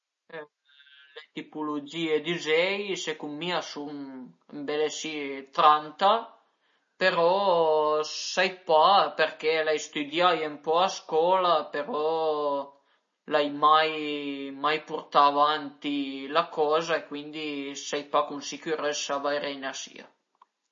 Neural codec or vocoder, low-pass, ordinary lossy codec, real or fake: none; 7.2 kHz; MP3, 32 kbps; real